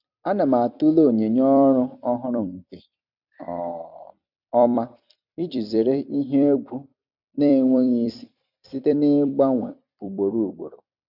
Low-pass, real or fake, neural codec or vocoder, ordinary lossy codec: 5.4 kHz; fake; vocoder, 44.1 kHz, 128 mel bands every 256 samples, BigVGAN v2; none